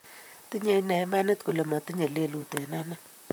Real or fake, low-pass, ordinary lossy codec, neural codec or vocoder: fake; none; none; vocoder, 44.1 kHz, 128 mel bands, Pupu-Vocoder